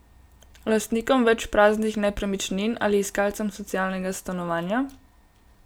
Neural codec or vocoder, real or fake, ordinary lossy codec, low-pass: none; real; none; none